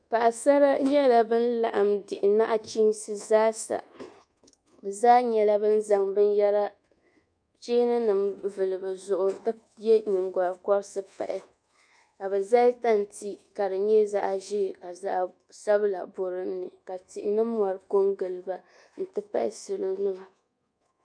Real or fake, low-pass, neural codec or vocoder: fake; 9.9 kHz; codec, 24 kHz, 1.2 kbps, DualCodec